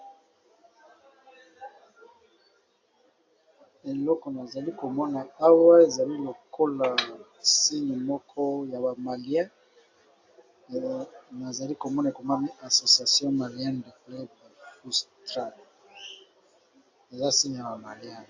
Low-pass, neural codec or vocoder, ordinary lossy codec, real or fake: 7.2 kHz; none; AAC, 48 kbps; real